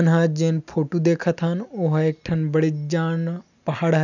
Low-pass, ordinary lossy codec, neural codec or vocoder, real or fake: 7.2 kHz; none; none; real